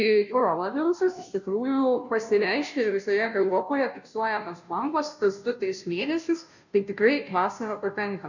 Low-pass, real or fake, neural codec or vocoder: 7.2 kHz; fake; codec, 16 kHz, 0.5 kbps, FunCodec, trained on Chinese and English, 25 frames a second